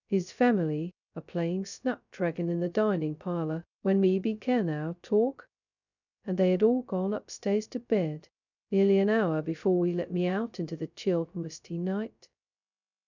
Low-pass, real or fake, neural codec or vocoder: 7.2 kHz; fake; codec, 16 kHz, 0.2 kbps, FocalCodec